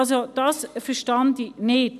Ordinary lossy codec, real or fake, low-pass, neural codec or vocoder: none; real; 14.4 kHz; none